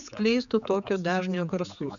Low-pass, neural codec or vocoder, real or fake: 7.2 kHz; codec, 16 kHz, 4 kbps, X-Codec, HuBERT features, trained on general audio; fake